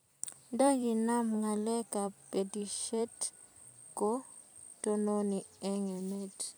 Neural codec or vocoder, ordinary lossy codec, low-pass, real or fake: vocoder, 44.1 kHz, 128 mel bands every 256 samples, BigVGAN v2; none; none; fake